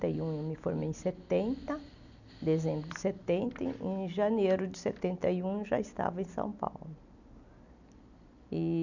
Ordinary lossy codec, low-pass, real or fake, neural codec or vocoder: none; 7.2 kHz; real; none